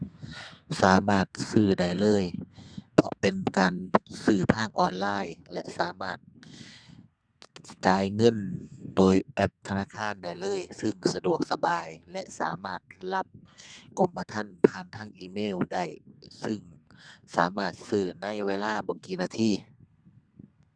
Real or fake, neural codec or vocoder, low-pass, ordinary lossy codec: fake; codec, 32 kHz, 1.9 kbps, SNAC; 9.9 kHz; Opus, 64 kbps